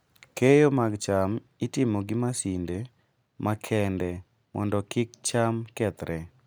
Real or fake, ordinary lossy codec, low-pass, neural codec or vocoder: real; none; none; none